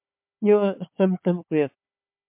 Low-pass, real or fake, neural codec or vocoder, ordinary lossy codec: 3.6 kHz; fake; codec, 16 kHz, 4 kbps, FunCodec, trained on Chinese and English, 50 frames a second; MP3, 32 kbps